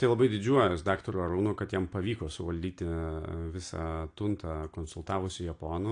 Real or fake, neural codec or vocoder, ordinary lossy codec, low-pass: real; none; AAC, 48 kbps; 9.9 kHz